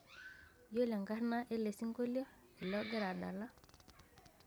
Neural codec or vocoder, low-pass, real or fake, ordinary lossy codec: vocoder, 44.1 kHz, 128 mel bands every 256 samples, BigVGAN v2; none; fake; none